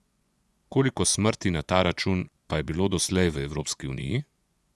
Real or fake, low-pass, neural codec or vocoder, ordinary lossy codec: fake; none; vocoder, 24 kHz, 100 mel bands, Vocos; none